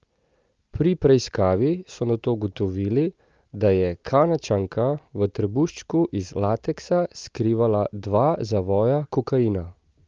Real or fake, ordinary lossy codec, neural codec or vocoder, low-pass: real; Opus, 24 kbps; none; 7.2 kHz